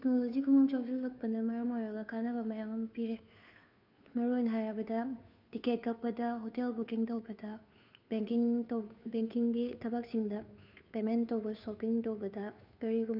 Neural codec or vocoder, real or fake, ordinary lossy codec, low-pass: codec, 16 kHz, 2 kbps, FunCodec, trained on Chinese and English, 25 frames a second; fake; none; 5.4 kHz